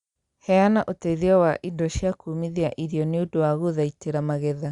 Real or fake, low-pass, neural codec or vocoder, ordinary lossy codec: real; 10.8 kHz; none; Opus, 64 kbps